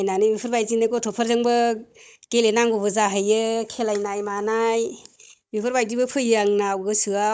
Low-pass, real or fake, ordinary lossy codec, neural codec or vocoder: none; fake; none; codec, 16 kHz, 16 kbps, FunCodec, trained on Chinese and English, 50 frames a second